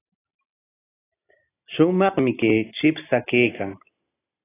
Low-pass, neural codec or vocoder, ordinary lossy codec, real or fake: 3.6 kHz; none; AAC, 16 kbps; real